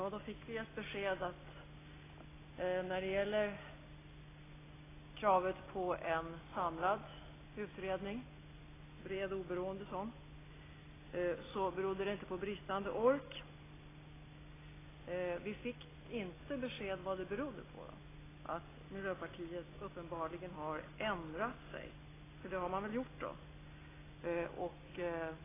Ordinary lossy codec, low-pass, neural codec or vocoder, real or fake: AAC, 16 kbps; 3.6 kHz; none; real